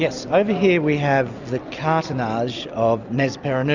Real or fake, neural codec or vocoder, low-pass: real; none; 7.2 kHz